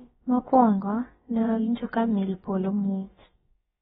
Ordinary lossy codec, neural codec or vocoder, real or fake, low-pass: AAC, 16 kbps; codec, 16 kHz, about 1 kbps, DyCAST, with the encoder's durations; fake; 7.2 kHz